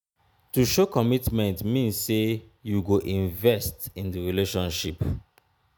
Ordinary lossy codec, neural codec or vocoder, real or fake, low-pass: none; none; real; none